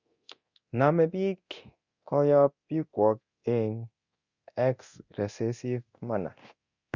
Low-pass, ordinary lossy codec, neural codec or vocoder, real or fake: 7.2 kHz; Opus, 64 kbps; codec, 24 kHz, 0.9 kbps, DualCodec; fake